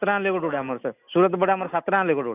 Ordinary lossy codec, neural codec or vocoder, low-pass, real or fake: none; none; 3.6 kHz; real